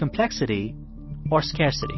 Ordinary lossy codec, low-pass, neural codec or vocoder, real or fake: MP3, 24 kbps; 7.2 kHz; none; real